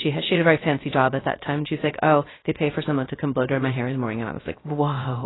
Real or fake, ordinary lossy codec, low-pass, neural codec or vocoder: fake; AAC, 16 kbps; 7.2 kHz; codec, 16 kHz, 0.3 kbps, FocalCodec